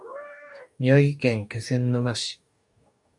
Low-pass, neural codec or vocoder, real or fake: 10.8 kHz; codec, 44.1 kHz, 2.6 kbps, DAC; fake